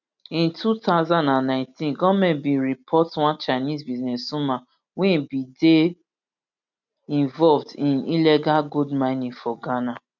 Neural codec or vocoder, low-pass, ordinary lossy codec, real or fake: none; 7.2 kHz; none; real